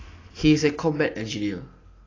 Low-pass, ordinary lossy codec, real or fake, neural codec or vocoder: 7.2 kHz; AAC, 32 kbps; real; none